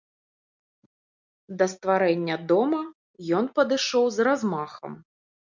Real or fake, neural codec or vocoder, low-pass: real; none; 7.2 kHz